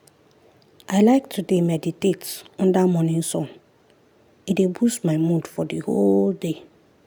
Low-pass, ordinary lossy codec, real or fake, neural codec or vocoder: none; none; real; none